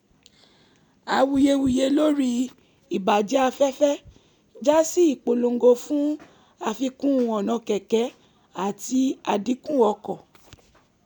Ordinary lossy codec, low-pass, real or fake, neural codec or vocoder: none; 19.8 kHz; real; none